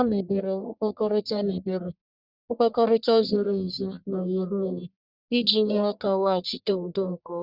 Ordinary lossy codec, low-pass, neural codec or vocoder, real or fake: Opus, 64 kbps; 5.4 kHz; codec, 44.1 kHz, 1.7 kbps, Pupu-Codec; fake